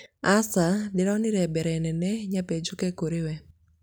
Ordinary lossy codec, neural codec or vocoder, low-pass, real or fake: none; none; none; real